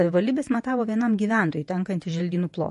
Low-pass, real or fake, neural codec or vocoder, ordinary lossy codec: 14.4 kHz; fake; vocoder, 48 kHz, 128 mel bands, Vocos; MP3, 48 kbps